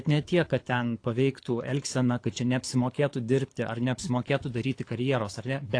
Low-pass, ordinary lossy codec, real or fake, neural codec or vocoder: 9.9 kHz; AAC, 48 kbps; fake; codec, 24 kHz, 6 kbps, HILCodec